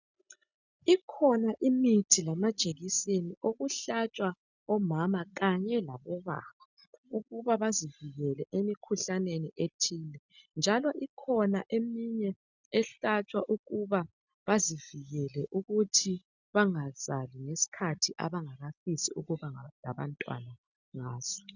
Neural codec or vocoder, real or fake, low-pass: none; real; 7.2 kHz